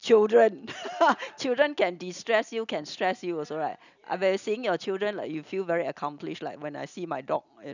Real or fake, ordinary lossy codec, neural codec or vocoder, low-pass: real; none; none; 7.2 kHz